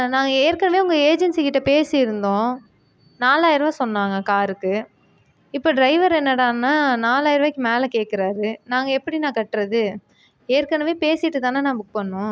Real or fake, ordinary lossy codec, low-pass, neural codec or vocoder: real; none; none; none